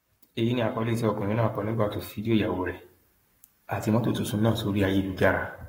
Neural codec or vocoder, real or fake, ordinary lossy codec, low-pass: codec, 44.1 kHz, 7.8 kbps, Pupu-Codec; fake; AAC, 48 kbps; 19.8 kHz